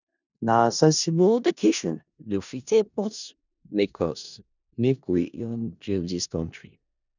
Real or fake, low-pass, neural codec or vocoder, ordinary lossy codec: fake; 7.2 kHz; codec, 16 kHz in and 24 kHz out, 0.4 kbps, LongCat-Audio-Codec, four codebook decoder; none